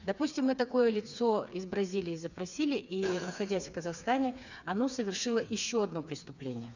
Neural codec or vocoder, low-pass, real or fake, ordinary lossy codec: codec, 16 kHz, 4 kbps, FreqCodec, smaller model; 7.2 kHz; fake; none